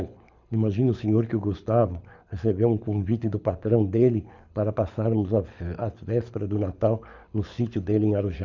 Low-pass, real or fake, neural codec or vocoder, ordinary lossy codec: 7.2 kHz; fake; codec, 24 kHz, 6 kbps, HILCodec; none